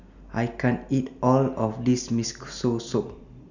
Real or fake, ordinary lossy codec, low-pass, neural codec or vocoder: real; none; 7.2 kHz; none